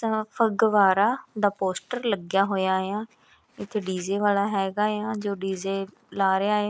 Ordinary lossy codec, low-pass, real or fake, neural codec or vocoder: none; none; real; none